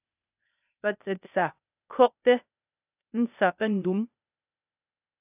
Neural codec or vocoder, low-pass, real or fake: codec, 16 kHz, 0.8 kbps, ZipCodec; 3.6 kHz; fake